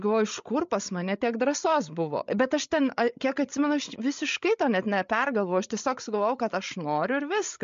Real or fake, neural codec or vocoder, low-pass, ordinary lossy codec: fake; codec, 16 kHz, 16 kbps, FreqCodec, larger model; 7.2 kHz; MP3, 48 kbps